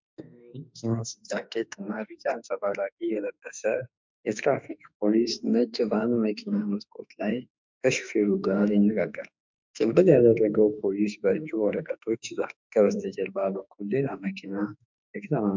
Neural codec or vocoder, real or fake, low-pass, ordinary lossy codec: autoencoder, 48 kHz, 32 numbers a frame, DAC-VAE, trained on Japanese speech; fake; 7.2 kHz; MP3, 64 kbps